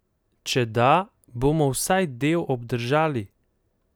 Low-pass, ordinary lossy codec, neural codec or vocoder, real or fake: none; none; none; real